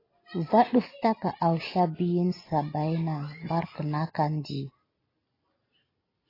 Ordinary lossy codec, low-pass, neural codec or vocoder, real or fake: AAC, 24 kbps; 5.4 kHz; none; real